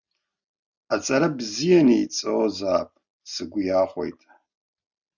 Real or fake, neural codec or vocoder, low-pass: real; none; 7.2 kHz